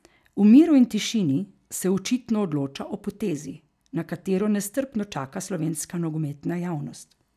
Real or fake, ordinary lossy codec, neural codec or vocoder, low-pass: real; none; none; 14.4 kHz